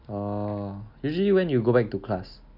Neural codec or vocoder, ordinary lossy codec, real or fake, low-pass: none; none; real; 5.4 kHz